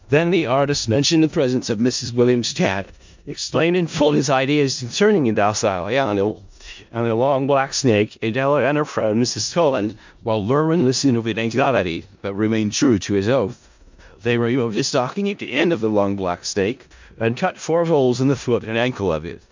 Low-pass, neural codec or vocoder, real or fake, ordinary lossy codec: 7.2 kHz; codec, 16 kHz in and 24 kHz out, 0.4 kbps, LongCat-Audio-Codec, four codebook decoder; fake; MP3, 64 kbps